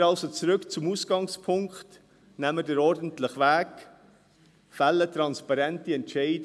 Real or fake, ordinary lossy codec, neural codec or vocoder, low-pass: real; none; none; none